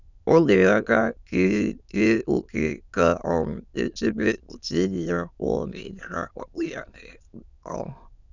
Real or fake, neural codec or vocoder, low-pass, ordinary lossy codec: fake; autoencoder, 22.05 kHz, a latent of 192 numbers a frame, VITS, trained on many speakers; 7.2 kHz; none